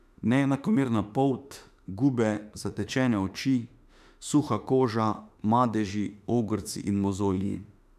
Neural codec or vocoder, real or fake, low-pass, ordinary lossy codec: autoencoder, 48 kHz, 32 numbers a frame, DAC-VAE, trained on Japanese speech; fake; 14.4 kHz; none